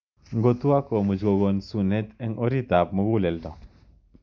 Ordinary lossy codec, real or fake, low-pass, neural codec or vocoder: none; real; 7.2 kHz; none